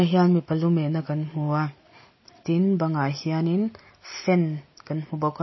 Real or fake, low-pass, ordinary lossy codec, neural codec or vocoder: real; 7.2 kHz; MP3, 24 kbps; none